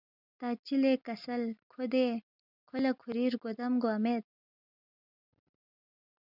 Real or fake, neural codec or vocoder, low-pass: real; none; 5.4 kHz